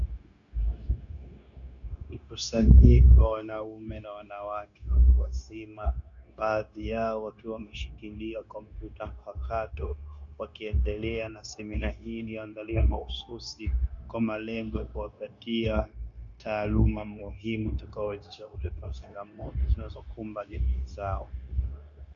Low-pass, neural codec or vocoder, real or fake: 7.2 kHz; codec, 16 kHz, 0.9 kbps, LongCat-Audio-Codec; fake